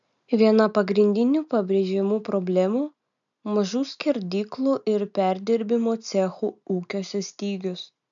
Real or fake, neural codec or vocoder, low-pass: real; none; 7.2 kHz